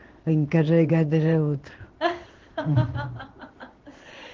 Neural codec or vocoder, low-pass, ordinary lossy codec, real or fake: codec, 16 kHz in and 24 kHz out, 1 kbps, XY-Tokenizer; 7.2 kHz; Opus, 16 kbps; fake